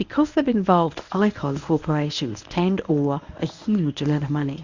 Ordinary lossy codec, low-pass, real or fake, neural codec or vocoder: Opus, 64 kbps; 7.2 kHz; fake; codec, 24 kHz, 0.9 kbps, WavTokenizer, medium speech release version 1